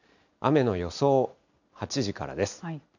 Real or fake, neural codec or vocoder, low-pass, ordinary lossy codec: real; none; 7.2 kHz; none